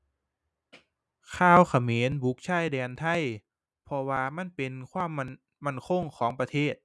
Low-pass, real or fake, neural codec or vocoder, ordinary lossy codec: none; real; none; none